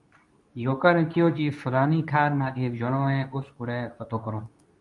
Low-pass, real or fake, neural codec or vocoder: 10.8 kHz; fake; codec, 24 kHz, 0.9 kbps, WavTokenizer, medium speech release version 2